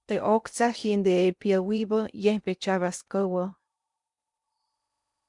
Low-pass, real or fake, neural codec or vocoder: 10.8 kHz; fake; codec, 16 kHz in and 24 kHz out, 0.8 kbps, FocalCodec, streaming, 65536 codes